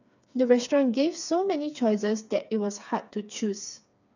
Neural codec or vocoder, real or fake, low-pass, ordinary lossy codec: codec, 16 kHz, 4 kbps, FreqCodec, smaller model; fake; 7.2 kHz; none